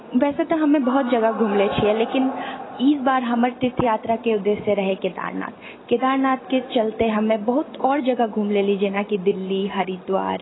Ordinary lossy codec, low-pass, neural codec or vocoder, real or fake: AAC, 16 kbps; 7.2 kHz; none; real